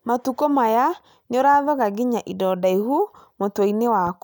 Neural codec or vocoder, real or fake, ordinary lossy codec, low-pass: none; real; none; none